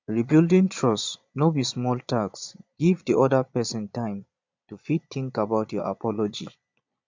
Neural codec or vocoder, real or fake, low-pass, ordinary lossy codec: vocoder, 22.05 kHz, 80 mel bands, Vocos; fake; 7.2 kHz; MP3, 64 kbps